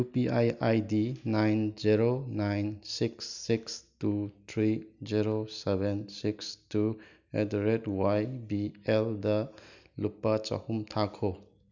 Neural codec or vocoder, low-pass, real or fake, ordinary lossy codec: none; 7.2 kHz; real; none